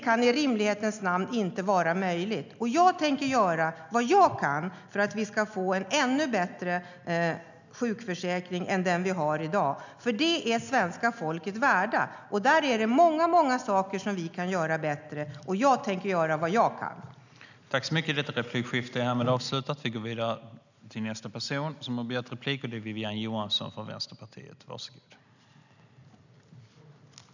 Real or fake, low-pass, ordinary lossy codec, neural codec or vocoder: real; 7.2 kHz; none; none